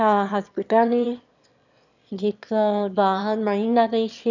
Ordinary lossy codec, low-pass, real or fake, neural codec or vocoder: none; 7.2 kHz; fake; autoencoder, 22.05 kHz, a latent of 192 numbers a frame, VITS, trained on one speaker